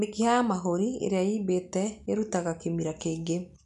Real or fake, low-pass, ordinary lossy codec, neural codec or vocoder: real; 10.8 kHz; none; none